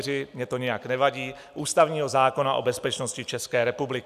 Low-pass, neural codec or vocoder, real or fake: 14.4 kHz; none; real